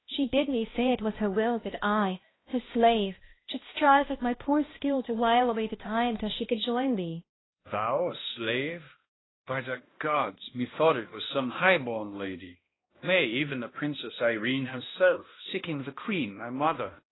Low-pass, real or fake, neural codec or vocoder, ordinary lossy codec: 7.2 kHz; fake; codec, 16 kHz, 1 kbps, X-Codec, HuBERT features, trained on balanced general audio; AAC, 16 kbps